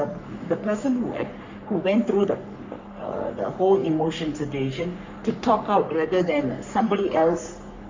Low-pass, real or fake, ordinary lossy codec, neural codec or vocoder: 7.2 kHz; fake; MP3, 64 kbps; codec, 44.1 kHz, 3.4 kbps, Pupu-Codec